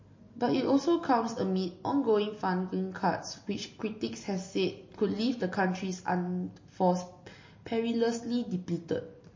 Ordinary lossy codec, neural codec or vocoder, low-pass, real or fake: MP3, 32 kbps; none; 7.2 kHz; real